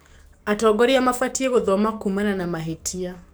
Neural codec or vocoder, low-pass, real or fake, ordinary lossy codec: codec, 44.1 kHz, 7.8 kbps, DAC; none; fake; none